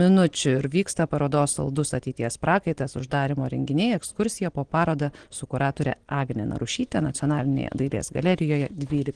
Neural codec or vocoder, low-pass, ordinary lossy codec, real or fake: none; 9.9 kHz; Opus, 16 kbps; real